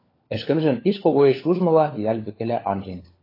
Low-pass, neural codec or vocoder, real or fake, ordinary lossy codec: 5.4 kHz; codec, 16 kHz, 4 kbps, FunCodec, trained on LibriTTS, 50 frames a second; fake; AAC, 24 kbps